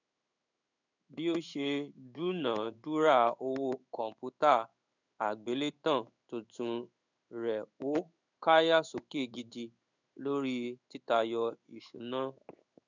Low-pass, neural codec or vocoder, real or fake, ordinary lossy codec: 7.2 kHz; codec, 16 kHz in and 24 kHz out, 1 kbps, XY-Tokenizer; fake; none